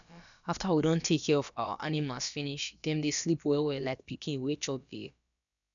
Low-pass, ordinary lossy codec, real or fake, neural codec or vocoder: 7.2 kHz; none; fake; codec, 16 kHz, about 1 kbps, DyCAST, with the encoder's durations